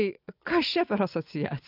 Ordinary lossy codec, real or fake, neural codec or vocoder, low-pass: AAC, 48 kbps; fake; vocoder, 24 kHz, 100 mel bands, Vocos; 5.4 kHz